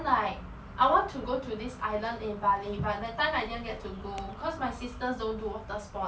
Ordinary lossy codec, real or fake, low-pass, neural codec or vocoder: none; real; none; none